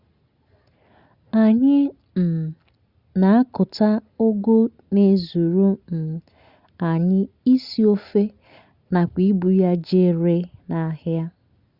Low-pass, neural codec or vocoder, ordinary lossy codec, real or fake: 5.4 kHz; none; Opus, 64 kbps; real